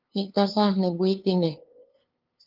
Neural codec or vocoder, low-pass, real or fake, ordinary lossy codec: codec, 16 kHz, 2 kbps, FunCodec, trained on LibriTTS, 25 frames a second; 5.4 kHz; fake; Opus, 24 kbps